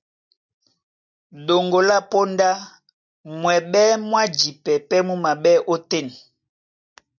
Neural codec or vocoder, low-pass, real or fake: none; 7.2 kHz; real